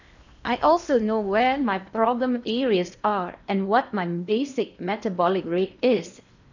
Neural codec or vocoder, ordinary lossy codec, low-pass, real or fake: codec, 16 kHz in and 24 kHz out, 0.8 kbps, FocalCodec, streaming, 65536 codes; none; 7.2 kHz; fake